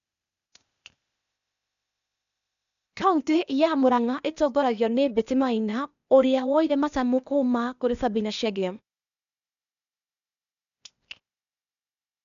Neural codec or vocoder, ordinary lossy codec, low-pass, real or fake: codec, 16 kHz, 0.8 kbps, ZipCodec; MP3, 96 kbps; 7.2 kHz; fake